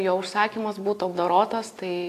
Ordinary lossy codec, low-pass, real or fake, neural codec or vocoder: AAC, 64 kbps; 14.4 kHz; real; none